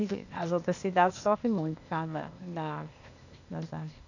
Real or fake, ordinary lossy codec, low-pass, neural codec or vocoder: fake; none; 7.2 kHz; codec, 16 kHz, 0.8 kbps, ZipCodec